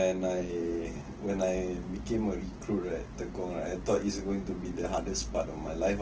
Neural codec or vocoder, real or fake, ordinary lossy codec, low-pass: none; real; Opus, 16 kbps; 7.2 kHz